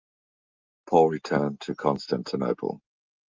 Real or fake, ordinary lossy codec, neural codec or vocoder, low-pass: real; Opus, 32 kbps; none; 7.2 kHz